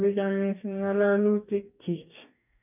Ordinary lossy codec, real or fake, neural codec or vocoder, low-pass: none; fake; codec, 44.1 kHz, 2.6 kbps, DAC; 3.6 kHz